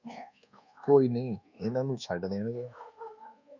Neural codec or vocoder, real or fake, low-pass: codec, 24 kHz, 1.2 kbps, DualCodec; fake; 7.2 kHz